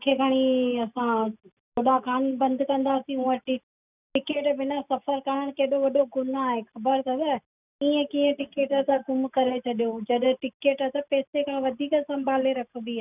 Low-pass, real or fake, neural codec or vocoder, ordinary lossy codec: 3.6 kHz; real; none; none